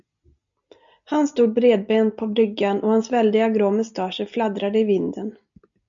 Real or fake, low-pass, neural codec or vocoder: real; 7.2 kHz; none